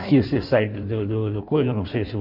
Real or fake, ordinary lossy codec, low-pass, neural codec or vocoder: fake; none; 5.4 kHz; codec, 16 kHz in and 24 kHz out, 1.1 kbps, FireRedTTS-2 codec